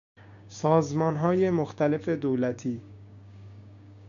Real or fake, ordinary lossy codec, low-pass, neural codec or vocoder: fake; MP3, 96 kbps; 7.2 kHz; codec, 16 kHz, 6 kbps, DAC